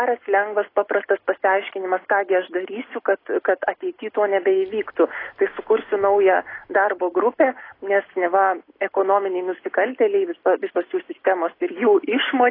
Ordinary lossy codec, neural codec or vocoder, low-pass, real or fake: AAC, 24 kbps; none; 5.4 kHz; real